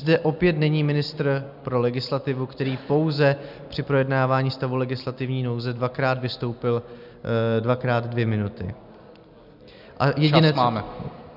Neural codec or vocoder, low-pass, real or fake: none; 5.4 kHz; real